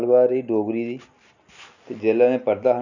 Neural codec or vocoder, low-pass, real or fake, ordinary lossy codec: none; 7.2 kHz; real; none